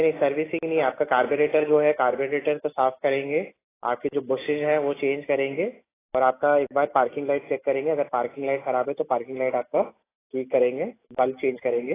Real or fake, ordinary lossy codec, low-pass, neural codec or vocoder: real; AAC, 16 kbps; 3.6 kHz; none